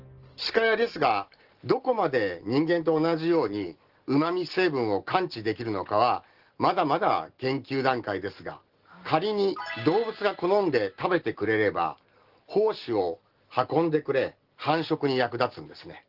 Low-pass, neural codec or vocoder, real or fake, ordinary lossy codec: 5.4 kHz; none; real; Opus, 16 kbps